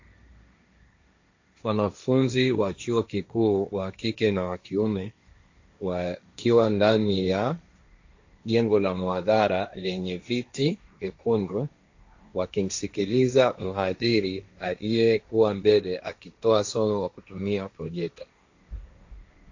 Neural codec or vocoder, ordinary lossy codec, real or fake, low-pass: codec, 16 kHz, 1.1 kbps, Voila-Tokenizer; AAC, 48 kbps; fake; 7.2 kHz